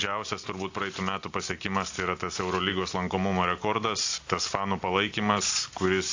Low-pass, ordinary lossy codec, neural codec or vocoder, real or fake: 7.2 kHz; AAC, 48 kbps; none; real